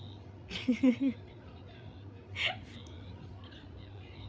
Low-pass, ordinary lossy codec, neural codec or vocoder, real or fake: none; none; codec, 16 kHz, 8 kbps, FreqCodec, larger model; fake